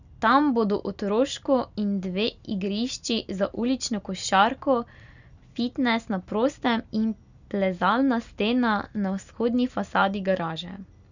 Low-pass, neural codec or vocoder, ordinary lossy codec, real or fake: 7.2 kHz; none; none; real